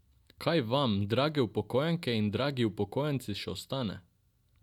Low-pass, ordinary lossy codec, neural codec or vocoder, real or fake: 19.8 kHz; none; none; real